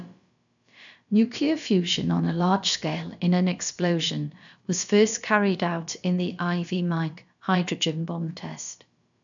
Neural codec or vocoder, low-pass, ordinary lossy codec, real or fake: codec, 16 kHz, about 1 kbps, DyCAST, with the encoder's durations; 7.2 kHz; none; fake